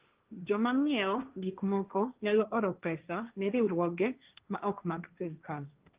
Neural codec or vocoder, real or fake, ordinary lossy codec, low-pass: codec, 16 kHz, 1.1 kbps, Voila-Tokenizer; fake; Opus, 32 kbps; 3.6 kHz